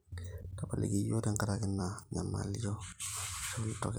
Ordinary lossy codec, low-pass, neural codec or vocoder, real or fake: none; none; vocoder, 44.1 kHz, 128 mel bands every 256 samples, BigVGAN v2; fake